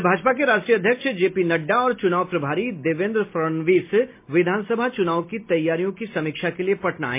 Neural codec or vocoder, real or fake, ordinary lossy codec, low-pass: none; real; MP3, 24 kbps; 3.6 kHz